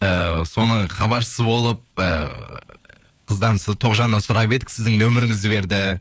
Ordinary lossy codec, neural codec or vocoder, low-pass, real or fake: none; codec, 16 kHz, 4 kbps, FunCodec, trained on LibriTTS, 50 frames a second; none; fake